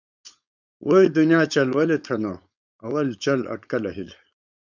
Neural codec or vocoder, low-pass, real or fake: codec, 16 kHz, 4.8 kbps, FACodec; 7.2 kHz; fake